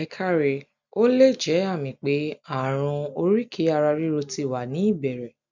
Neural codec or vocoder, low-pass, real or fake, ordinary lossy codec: none; 7.2 kHz; real; none